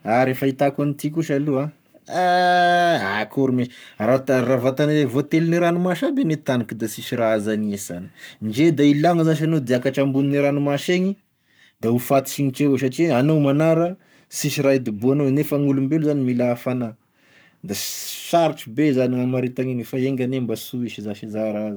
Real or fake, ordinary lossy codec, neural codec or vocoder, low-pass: fake; none; codec, 44.1 kHz, 7.8 kbps, Pupu-Codec; none